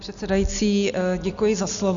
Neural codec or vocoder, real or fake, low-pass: none; real; 7.2 kHz